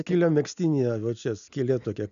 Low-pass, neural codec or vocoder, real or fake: 7.2 kHz; none; real